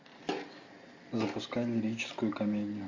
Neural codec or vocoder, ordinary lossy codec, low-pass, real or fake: none; MP3, 48 kbps; 7.2 kHz; real